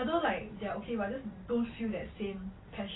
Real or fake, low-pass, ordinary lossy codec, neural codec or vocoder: real; 7.2 kHz; AAC, 16 kbps; none